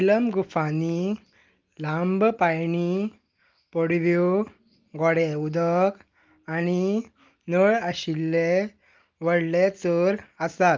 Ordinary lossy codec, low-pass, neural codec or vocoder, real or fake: Opus, 24 kbps; 7.2 kHz; none; real